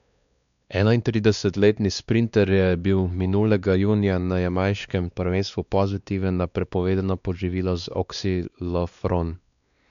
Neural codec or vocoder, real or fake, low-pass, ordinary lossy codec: codec, 16 kHz, 2 kbps, X-Codec, WavLM features, trained on Multilingual LibriSpeech; fake; 7.2 kHz; none